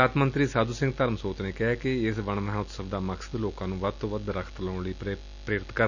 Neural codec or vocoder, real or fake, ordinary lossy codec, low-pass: none; real; none; 7.2 kHz